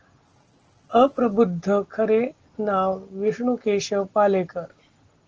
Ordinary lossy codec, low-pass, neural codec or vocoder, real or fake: Opus, 16 kbps; 7.2 kHz; none; real